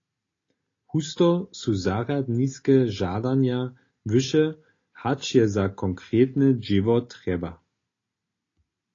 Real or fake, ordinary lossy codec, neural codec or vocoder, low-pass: real; AAC, 32 kbps; none; 7.2 kHz